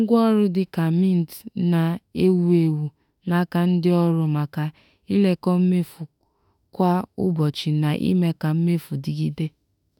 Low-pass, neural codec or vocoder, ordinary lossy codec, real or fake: 19.8 kHz; autoencoder, 48 kHz, 32 numbers a frame, DAC-VAE, trained on Japanese speech; none; fake